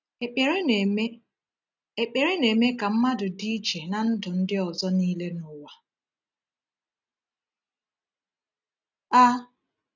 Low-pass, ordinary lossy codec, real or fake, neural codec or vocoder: 7.2 kHz; none; real; none